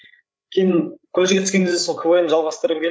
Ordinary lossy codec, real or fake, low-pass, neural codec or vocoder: none; fake; none; codec, 16 kHz, 8 kbps, FreqCodec, larger model